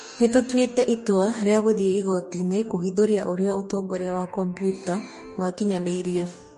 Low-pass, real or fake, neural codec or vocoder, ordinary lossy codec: 14.4 kHz; fake; codec, 44.1 kHz, 2.6 kbps, DAC; MP3, 48 kbps